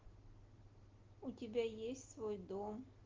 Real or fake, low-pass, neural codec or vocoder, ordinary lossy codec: real; 7.2 kHz; none; Opus, 16 kbps